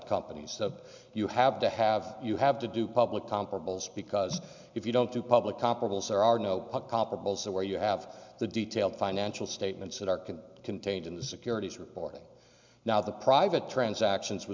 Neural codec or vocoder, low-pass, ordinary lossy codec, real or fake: none; 7.2 kHz; MP3, 64 kbps; real